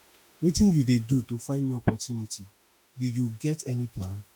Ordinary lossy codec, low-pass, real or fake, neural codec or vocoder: none; none; fake; autoencoder, 48 kHz, 32 numbers a frame, DAC-VAE, trained on Japanese speech